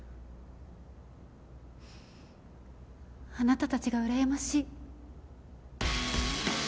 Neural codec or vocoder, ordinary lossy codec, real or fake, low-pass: none; none; real; none